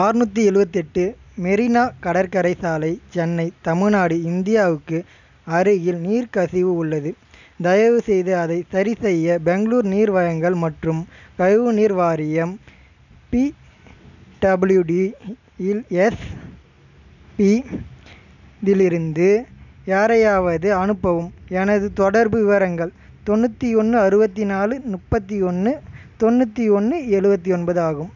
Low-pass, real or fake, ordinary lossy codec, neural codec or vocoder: 7.2 kHz; real; none; none